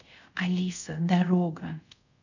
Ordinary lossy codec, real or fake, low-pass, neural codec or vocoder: none; fake; 7.2 kHz; codec, 24 kHz, 0.5 kbps, DualCodec